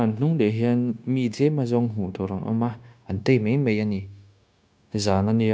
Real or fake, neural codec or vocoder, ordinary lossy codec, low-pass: fake; codec, 16 kHz, 0.9 kbps, LongCat-Audio-Codec; none; none